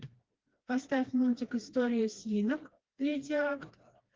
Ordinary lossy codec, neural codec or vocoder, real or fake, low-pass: Opus, 16 kbps; codec, 16 kHz, 2 kbps, FreqCodec, smaller model; fake; 7.2 kHz